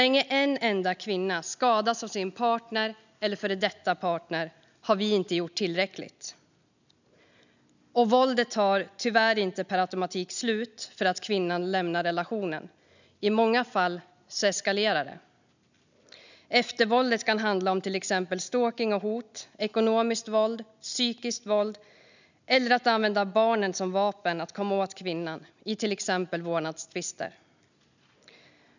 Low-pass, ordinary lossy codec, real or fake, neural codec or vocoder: 7.2 kHz; none; real; none